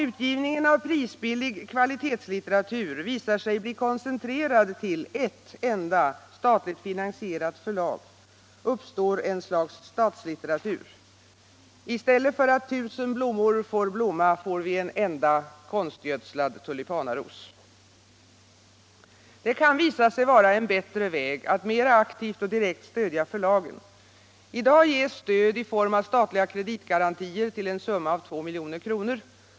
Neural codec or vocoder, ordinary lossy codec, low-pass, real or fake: none; none; none; real